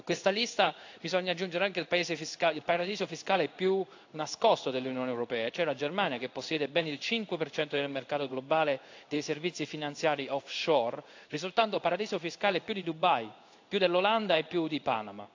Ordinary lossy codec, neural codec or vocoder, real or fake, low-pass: AAC, 48 kbps; codec, 16 kHz in and 24 kHz out, 1 kbps, XY-Tokenizer; fake; 7.2 kHz